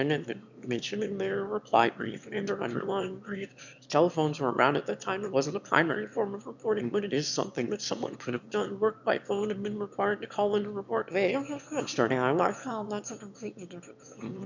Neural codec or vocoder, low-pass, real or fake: autoencoder, 22.05 kHz, a latent of 192 numbers a frame, VITS, trained on one speaker; 7.2 kHz; fake